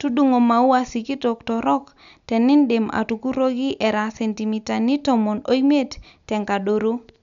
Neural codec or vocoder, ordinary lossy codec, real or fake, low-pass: none; none; real; 7.2 kHz